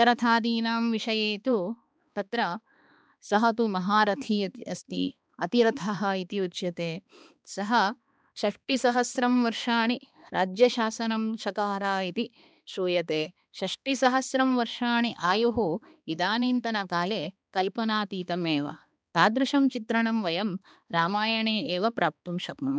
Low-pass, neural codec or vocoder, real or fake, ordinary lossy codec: none; codec, 16 kHz, 2 kbps, X-Codec, HuBERT features, trained on balanced general audio; fake; none